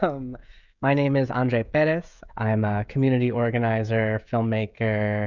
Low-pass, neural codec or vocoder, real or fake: 7.2 kHz; codec, 16 kHz, 16 kbps, FreqCodec, smaller model; fake